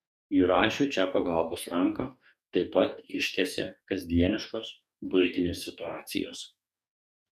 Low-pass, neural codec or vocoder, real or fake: 14.4 kHz; codec, 44.1 kHz, 2.6 kbps, DAC; fake